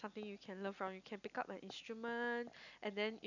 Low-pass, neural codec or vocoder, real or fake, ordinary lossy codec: 7.2 kHz; none; real; none